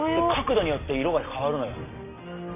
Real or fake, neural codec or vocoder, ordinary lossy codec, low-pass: real; none; none; 3.6 kHz